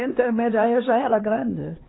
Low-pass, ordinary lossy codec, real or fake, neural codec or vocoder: 7.2 kHz; AAC, 16 kbps; fake; codec, 16 kHz, 2 kbps, X-Codec, HuBERT features, trained on LibriSpeech